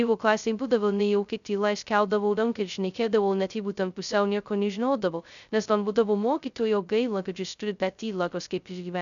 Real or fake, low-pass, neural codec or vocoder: fake; 7.2 kHz; codec, 16 kHz, 0.2 kbps, FocalCodec